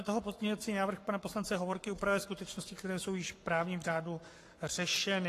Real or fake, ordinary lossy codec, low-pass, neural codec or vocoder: fake; AAC, 48 kbps; 14.4 kHz; codec, 44.1 kHz, 7.8 kbps, Pupu-Codec